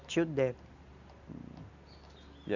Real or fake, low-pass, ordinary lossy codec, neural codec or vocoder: real; 7.2 kHz; none; none